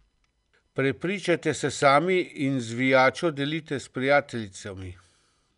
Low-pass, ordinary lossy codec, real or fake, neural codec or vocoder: 10.8 kHz; none; real; none